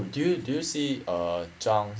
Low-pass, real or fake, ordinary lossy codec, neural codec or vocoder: none; real; none; none